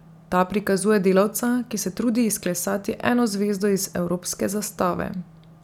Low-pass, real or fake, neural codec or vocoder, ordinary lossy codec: 19.8 kHz; real; none; none